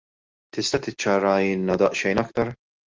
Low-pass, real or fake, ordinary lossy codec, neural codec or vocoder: 7.2 kHz; real; Opus, 24 kbps; none